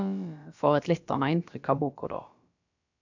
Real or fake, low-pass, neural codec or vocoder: fake; 7.2 kHz; codec, 16 kHz, about 1 kbps, DyCAST, with the encoder's durations